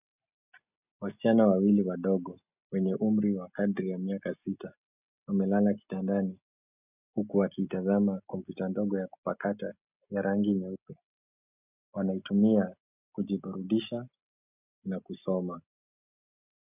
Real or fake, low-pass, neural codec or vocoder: real; 3.6 kHz; none